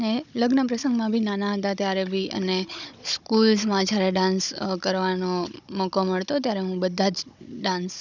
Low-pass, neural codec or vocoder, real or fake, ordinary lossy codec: 7.2 kHz; codec, 16 kHz, 16 kbps, FunCodec, trained on Chinese and English, 50 frames a second; fake; none